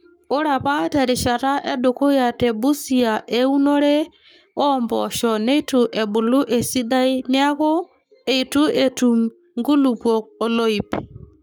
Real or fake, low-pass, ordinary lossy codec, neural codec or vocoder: fake; none; none; codec, 44.1 kHz, 7.8 kbps, Pupu-Codec